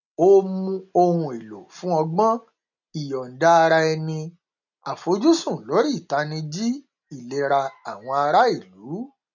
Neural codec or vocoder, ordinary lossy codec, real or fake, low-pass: none; none; real; 7.2 kHz